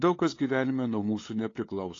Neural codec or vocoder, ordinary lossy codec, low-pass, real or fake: codec, 16 kHz, 8 kbps, FunCodec, trained on LibriTTS, 25 frames a second; AAC, 32 kbps; 7.2 kHz; fake